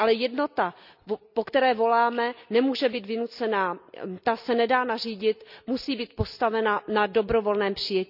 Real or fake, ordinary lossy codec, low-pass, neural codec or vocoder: real; none; 5.4 kHz; none